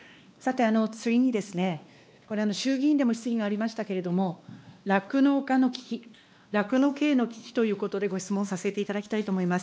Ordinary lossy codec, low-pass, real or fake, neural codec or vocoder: none; none; fake; codec, 16 kHz, 2 kbps, X-Codec, WavLM features, trained on Multilingual LibriSpeech